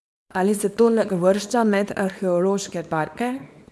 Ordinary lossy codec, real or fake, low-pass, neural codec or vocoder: none; fake; none; codec, 24 kHz, 0.9 kbps, WavTokenizer, small release